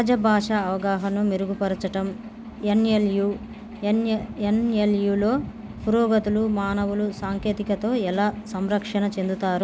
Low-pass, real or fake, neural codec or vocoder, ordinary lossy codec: none; real; none; none